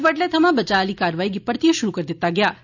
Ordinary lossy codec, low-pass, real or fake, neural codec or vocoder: none; 7.2 kHz; real; none